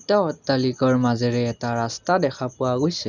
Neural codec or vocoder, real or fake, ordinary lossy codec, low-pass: none; real; none; 7.2 kHz